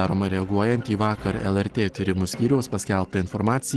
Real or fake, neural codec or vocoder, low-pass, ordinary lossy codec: real; none; 10.8 kHz; Opus, 16 kbps